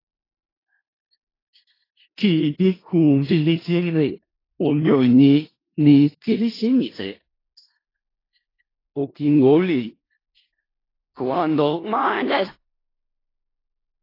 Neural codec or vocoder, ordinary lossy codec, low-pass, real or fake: codec, 16 kHz in and 24 kHz out, 0.4 kbps, LongCat-Audio-Codec, four codebook decoder; AAC, 24 kbps; 5.4 kHz; fake